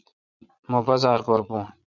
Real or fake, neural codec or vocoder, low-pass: fake; vocoder, 22.05 kHz, 80 mel bands, Vocos; 7.2 kHz